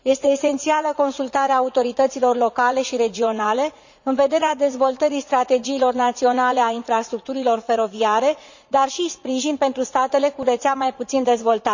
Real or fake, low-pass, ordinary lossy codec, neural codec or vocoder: fake; 7.2 kHz; Opus, 64 kbps; vocoder, 44.1 kHz, 80 mel bands, Vocos